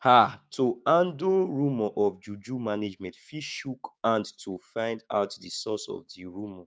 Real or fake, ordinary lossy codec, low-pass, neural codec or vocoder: fake; none; none; codec, 16 kHz, 6 kbps, DAC